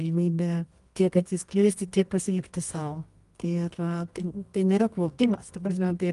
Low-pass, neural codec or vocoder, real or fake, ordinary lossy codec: 10.8 kHz; codec, 24 kHz, 0.9 kbps, WavTokenizer, medium music audio release; fake; Opus, 32 kbps